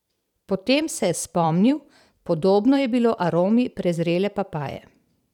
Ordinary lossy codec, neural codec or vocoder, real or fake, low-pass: none; vocoder, 44.1 kHz, 128 mel bands every 512 samples, BigVGAN v2; fake; 19.8 kHz